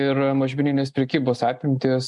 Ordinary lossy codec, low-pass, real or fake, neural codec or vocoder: MP3, 96 kbps; 10.8 kHz; real; none